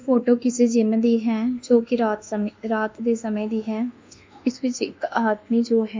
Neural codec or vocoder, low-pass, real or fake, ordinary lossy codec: codec, 24 kHz, 1.2 kbps, DualCodec; 7.2 kHz; fake; MP3, 64 kbps